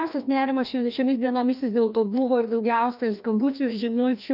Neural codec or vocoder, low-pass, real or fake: codec, 16 kHz, 1 kbps, FreqCodec, larger model; 5.4 kHz; fake